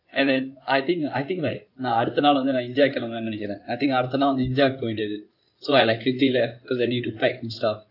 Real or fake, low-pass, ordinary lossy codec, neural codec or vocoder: fake; 5.4 kHz; none; codec, 16 kHz in and 24 kHz out, 2.2 kbps, FireRedTTS-2 codec